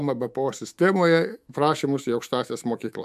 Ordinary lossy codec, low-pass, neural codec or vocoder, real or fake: AAC, 96 kbps; 14.4 kHz; autoencoder, 48 kHz, 128 numbers a frame, DAC-VAE, trained on Japanese speech; fake